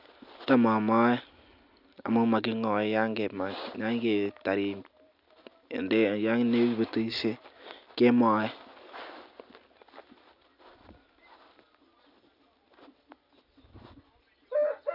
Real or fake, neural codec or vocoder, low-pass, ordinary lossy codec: real; none; 5.4 kHz; none